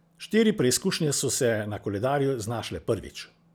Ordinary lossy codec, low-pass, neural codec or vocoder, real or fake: none; none; none; real